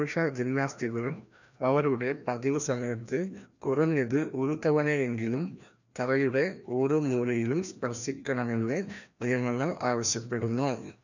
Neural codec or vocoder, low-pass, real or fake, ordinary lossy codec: codec, 16 kHz, 1 kbps, FreqCodec, larger model; 7.2 kHz; fake; none